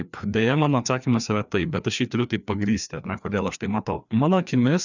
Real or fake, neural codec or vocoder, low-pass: fake; codec, 16 kHz, 2 kbps, FreqCodec, larger model; 7.2 kHz